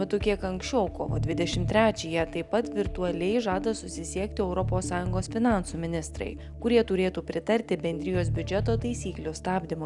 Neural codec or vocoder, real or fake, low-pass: none; real; 10.8 kHz